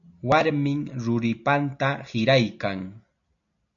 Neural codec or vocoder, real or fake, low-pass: none; real; 7.2 kHz